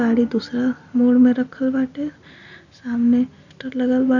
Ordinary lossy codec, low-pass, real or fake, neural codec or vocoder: none; 7.2 kHz; real; none